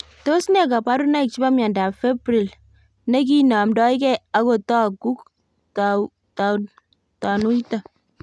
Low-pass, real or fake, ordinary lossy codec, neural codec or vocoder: none; real; none; none